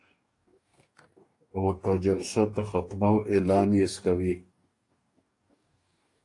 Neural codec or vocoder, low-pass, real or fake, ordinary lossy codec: codec, 44.1 kHz, 2.6 kbps, DAC; 10.8 kHz; fake; AAC, 48 kbps